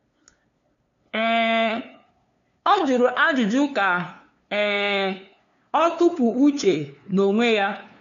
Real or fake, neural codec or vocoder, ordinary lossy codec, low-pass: fake; codec, 16 kHz, 2 kbps, FunCodec, trained on LibriTTS, 25 frames a second; none; 7.2 kHz